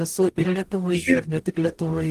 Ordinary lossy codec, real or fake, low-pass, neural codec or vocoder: Opus, 24 kbps; fake; 14.4 kHz; codec, 44.1 kHz, 0.9 kbps, DAC